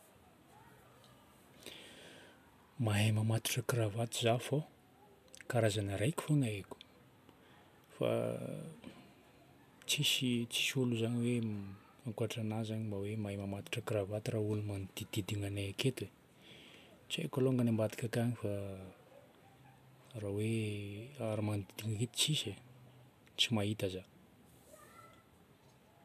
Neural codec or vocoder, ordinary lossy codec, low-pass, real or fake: none; MP3, 96 kbps; 14.4 kHz; real